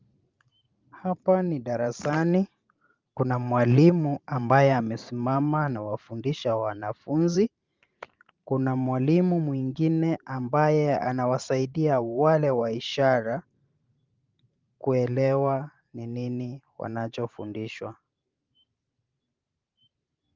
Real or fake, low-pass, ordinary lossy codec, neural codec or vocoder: fake; 7.2 kHz; Opus, 24 kbps; vocoder, 44.1 kHz, 128 mel bands every 512 samples, BigVGAN v2